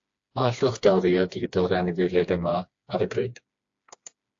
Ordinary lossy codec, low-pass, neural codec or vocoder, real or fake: AAC, 48 kbps; 7.2 kHz; codec, 16 kHz, 2 kbps, FreqCodec, smaller model; fake